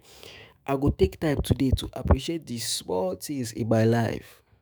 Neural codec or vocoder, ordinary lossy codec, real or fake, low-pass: autoencoder, 48 kHz, 128 numbers a frame, DAC-VAE, trained on Japanese speech; none; fake; none